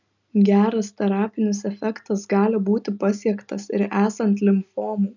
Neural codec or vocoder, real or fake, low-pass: none; real; 7.2 kHz